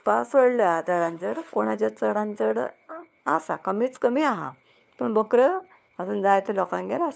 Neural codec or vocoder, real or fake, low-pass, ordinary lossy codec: codec, 16 kHz, 4 kbps, FunCodec, trained on LibriTTS, 50 frames a second; fake; none; none